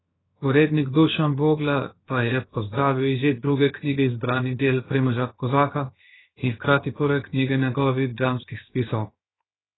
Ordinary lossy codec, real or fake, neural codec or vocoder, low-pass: AAC, 16 kbps; fake; codec, 16 kHz, 0.7 kbps, FocalCodec; 7.2 kHz